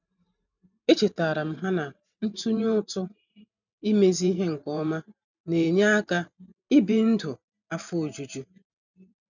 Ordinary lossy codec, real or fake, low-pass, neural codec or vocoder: none; fake; 7.2 kHz; vocoder, 44.1 kHz, 128 mel bands every 512 samples, BigVGAN v2